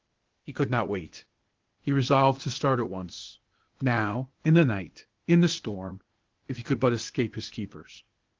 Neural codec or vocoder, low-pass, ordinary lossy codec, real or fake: codec, 16 kHz, 0.8 kbps, ZipCodec; 7.2 kHz; Opus, 16 kbps; fake